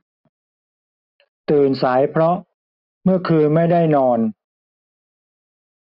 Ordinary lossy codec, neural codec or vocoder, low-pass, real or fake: none; none; 5.4 kHz; real